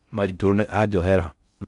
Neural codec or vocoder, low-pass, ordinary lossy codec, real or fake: codec, 16 kHz in and 24 kHz out, 0.6 kbps, FocalCodec, streaming, 4096 codes; 10.8 kHz; none; fake